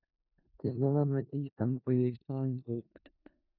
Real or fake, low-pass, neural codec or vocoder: fake; 5.4 kHz; codec, 16 kHz in and 24 kHz out, 0.4 kbps, LongCat-Audio-Codec, four codebook decoder